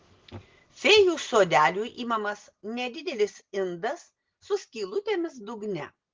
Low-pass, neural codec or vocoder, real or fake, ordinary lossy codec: 7.2 kHz; none; real; Opus, 16 kbps